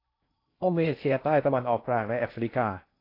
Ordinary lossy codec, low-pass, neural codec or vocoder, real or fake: AAC, 32 kbps; 5.4 kHz; codec, 16 kHz in and 24 kHz out, 0.6 kbps, FocalCodec, streaming, 2048 codes; fake